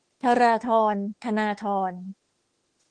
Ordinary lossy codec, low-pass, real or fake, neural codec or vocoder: Opus, 16 kbps; 9.9 kHz; fake; autoencoder, 48 kHz, 32 numbers a frame, DAC-VAE, trained on Japanese speech